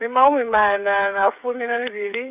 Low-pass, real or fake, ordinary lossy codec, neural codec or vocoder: 3.6 kHz; fake; none; codec, 16 kHz, 8 kbps, FreqCodec, smaller model